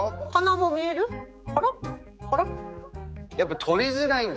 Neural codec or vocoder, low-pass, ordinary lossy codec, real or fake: codec, 16 kHz, 4 kbps, X-Codec, HuBERT features, trained on general audio; none; none; fake